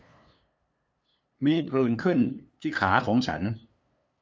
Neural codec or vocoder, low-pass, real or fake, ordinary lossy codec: codec, 16 kHz, 2 kbps, FunCodec, trained on LibriTTS, 25 frames a second; none; fake; none